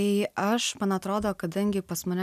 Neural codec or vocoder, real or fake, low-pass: none; real; 14.4 kHz